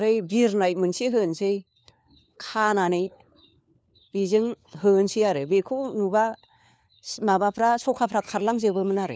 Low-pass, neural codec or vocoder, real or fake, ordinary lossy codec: none; codec, 16 kHz, 4 kbps, FunCodec, trained on LibriTTS, 50 frames a second; fake; none